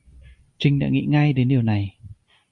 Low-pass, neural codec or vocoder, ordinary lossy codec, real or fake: 10.8 kHz; none; Opus, 64 kbps; real